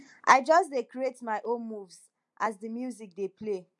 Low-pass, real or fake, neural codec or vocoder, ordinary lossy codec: 10.8 kHz; real; none; MP3, 64 kbps